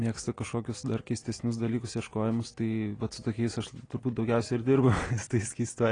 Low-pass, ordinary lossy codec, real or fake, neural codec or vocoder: 9.9 kHz; AAC, 32 kbps; real; none